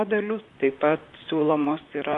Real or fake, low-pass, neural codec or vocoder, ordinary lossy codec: fake; 10.8 kHz; vocoder, 44.1 kHz, 128 mel bands, Pupu-Vocoder; AAC, 48 kbps